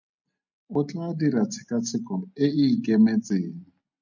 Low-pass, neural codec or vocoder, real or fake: 7.2 kHz; none; real